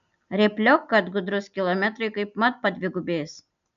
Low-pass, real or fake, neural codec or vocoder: 7.2 kHz; real; none